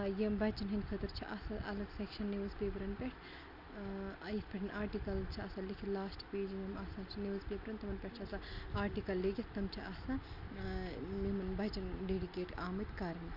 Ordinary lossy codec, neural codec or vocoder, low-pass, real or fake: none; none; 5.4 kHz; real